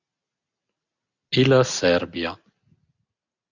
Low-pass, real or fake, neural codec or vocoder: 7.2 kHz; real; none